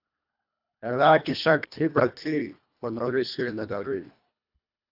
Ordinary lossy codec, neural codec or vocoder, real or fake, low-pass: AAC, 48 kbps; codec, 24 kHz, 1.5 kbps, HILCodec; fake; 5.4 kHz